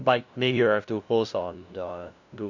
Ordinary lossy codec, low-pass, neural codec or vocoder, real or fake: none; 7.2 kHz; codec, 16 kHz, 0.5 kbps, FunCodec, trained on LibriTTS, 25 frames a second; fake